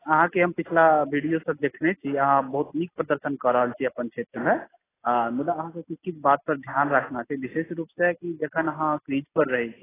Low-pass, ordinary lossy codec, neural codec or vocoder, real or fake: 3.6 kHz; AAC, 16 kbps; none; real